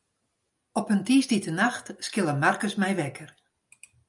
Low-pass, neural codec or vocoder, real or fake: 10.8 kHz; none; real